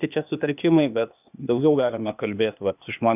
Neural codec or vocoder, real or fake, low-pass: codec, 16 kHz, 0.8 kbps, ZipCodec; fake; 3.6 kHz